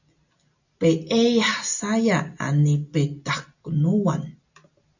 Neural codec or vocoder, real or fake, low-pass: none; real; 7.2 kHz